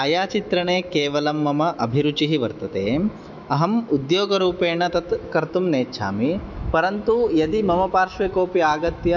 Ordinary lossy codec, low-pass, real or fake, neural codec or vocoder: none; 7.2 kHz; fake; autoencoder, 48 kHz, 128 numbers a frame, DAC-VAE, trained on Japanese speech